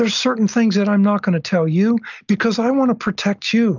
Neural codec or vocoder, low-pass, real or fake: none; 7.2 kHz; real